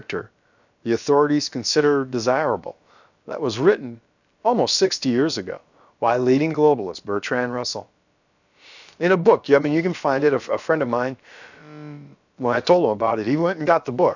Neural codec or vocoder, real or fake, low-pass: codec, 16 kHz, about 1 kbps, DyCAST, with the encoder's durations; fake; 7.2 kHz